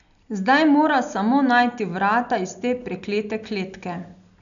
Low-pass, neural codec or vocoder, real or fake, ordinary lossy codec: 7.2 kHz; none; real; none